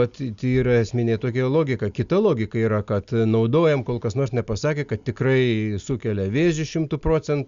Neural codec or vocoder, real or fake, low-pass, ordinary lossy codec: none; real; 7.2 kHz; Opus, 64 kbps